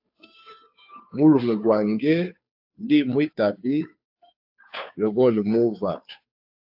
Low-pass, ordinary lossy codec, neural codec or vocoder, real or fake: 5.4 kHz; AAC, 48 kbps; codec, 16 kHz, 2 kbps, FunCodec, trained on Chinese and English, 25 frames a second; fake